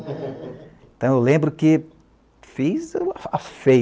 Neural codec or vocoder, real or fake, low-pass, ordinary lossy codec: none; real; none; none